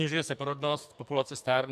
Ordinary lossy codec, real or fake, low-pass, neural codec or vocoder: AAC, 96 kbps; fake; 14.4 kHz; codec, 44.1 kHz, 2.6 kbps, SNAC